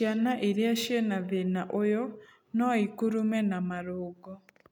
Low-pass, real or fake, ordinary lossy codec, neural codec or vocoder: 19.8 kHz; real; none; none